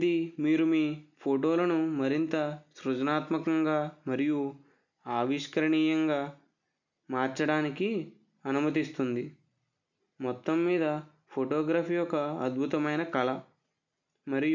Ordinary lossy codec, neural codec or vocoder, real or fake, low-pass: none; none; real; 7.2 kHz